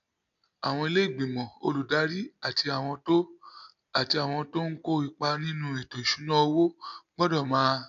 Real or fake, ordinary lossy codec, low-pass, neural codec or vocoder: real; none; 7.2 kHz; none